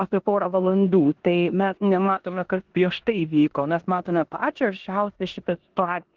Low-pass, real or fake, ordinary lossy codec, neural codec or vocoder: 7.2 kHz; fake; Opus, 16 kbps; codec, 16 kHz in and 24 kHz out, 0.9 kbps, LongCat-Audio-Codec, four codebook decoder